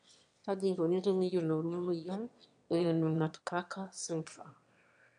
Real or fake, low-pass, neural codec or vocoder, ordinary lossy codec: fake; 9.9 kHz; autoencoder, 22.05 kHz, a latent of 192 numbers a frame, VITS, trained on one speaker; MP3, 64 kbps